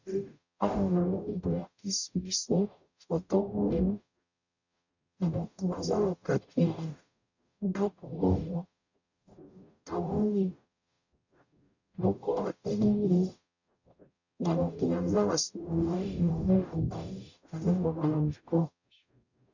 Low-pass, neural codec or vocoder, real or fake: 7.2 kHz; codec, 44.1 kHz, 0.9 kbps, DAC; fake